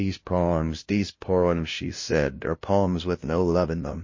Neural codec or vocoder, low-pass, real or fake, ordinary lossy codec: codec, 16 kHz, 0.5 kbps, FunCodec, trained on LibriTTS, 25 frames a second; 7.2 kHz; fake; MP3, 32 kbps